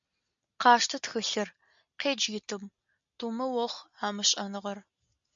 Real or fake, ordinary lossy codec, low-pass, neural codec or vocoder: real; MP3, 64 kbps; 7.2 kHz; none